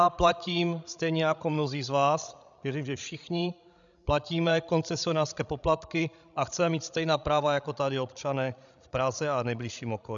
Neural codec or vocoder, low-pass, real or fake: codec, 16 kHz, 16 kbps, FreqCodec, larger model; 7.2 kHz; fake